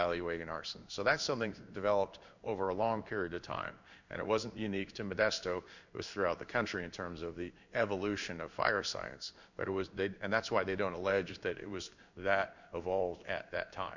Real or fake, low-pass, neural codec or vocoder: fake; 7.2 kHz; codec, 16 kHz in and 24 kHz out, 1 kbps, XY-Tokenizer